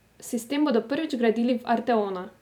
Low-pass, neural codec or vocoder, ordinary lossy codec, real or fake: 19.8 kHz; vocoder, 44.1 kHz, 128 mel bands every 256 samples, BigVGAN v2; none; fake